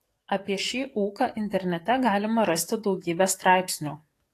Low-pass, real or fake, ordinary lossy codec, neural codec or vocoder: 14.4 kHz; fake; AAC, 48 kbps; codec, 44.1 kHz, 7.8 kbps, DAC